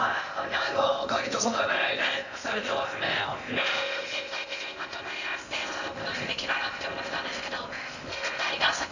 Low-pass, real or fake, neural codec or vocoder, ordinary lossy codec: 7.2 kHz; fake; codec, 16 kHz in and 24 kHz out, 0.6 kbps, FocalCodec, streaming, 2048 codes; none